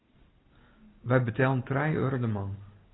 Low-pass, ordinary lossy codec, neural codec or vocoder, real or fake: 7.2 kHz; AAC, 16 kbps; none; real